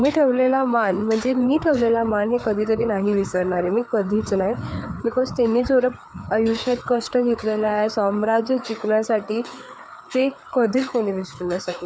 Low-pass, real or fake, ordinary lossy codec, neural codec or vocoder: none; fake; none; codec, 16 kHz, 4 kbps, FreqCodec, larger model